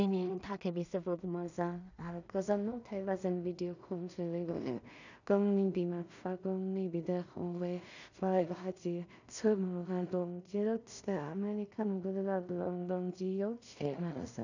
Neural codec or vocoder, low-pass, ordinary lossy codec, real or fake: codec, 16 kHz in and 24 kHz out, 0.4 kbps, LongCat-Audio-Codec, two codebook decoder; 7.2 kHz; none; fake